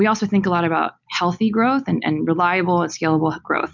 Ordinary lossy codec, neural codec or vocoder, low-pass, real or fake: MP3, 64 kbps; none; 7.2 kHz; real